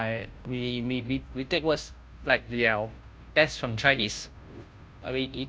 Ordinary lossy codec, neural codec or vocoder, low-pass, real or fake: none; codec, 16 kHz, 0.5 kbps, FunCodec, trained on Chinese and English, 25 frames a second; none; fake